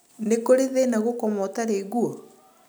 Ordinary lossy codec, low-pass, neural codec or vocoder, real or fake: none; none; none; real